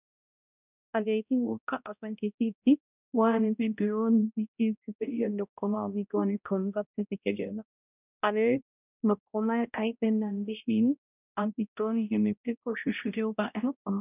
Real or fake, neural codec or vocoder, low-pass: fake; codec, 16 kHz, 0.5 kbps, X-Codec, HuBERT features, trained on balanced general audio; 3.6 kHz